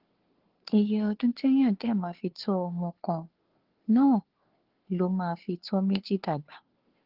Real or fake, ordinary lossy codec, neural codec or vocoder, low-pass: fake; Opus, 16 kbps; codec, 16 kHz, 2 kbps, FunCodec, trained on Chinese and English, 25 frames a second; 5.4 kHz